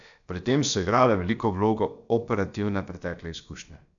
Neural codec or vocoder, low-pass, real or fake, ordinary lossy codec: codec, 16 kHz, about 1 kbps, DyCAST, with the encoder's durations; 7.2 kHz; fake; none